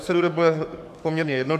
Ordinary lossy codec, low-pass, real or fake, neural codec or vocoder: MP3, 96 kbps; 14.4 kHz; fake; codec, 44.1 kHz, 7.8 kbps, DAC